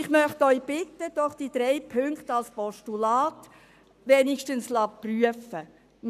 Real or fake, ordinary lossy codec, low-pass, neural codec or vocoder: fake; none; 14.4 kHz; codec, 44.1 kHz, 7.8 kbps, Pupu-Codec